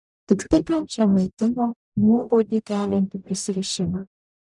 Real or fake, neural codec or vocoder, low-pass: fake; codec, 44.1 kHz, 0.9 kbps, DAC; 10.8 kHz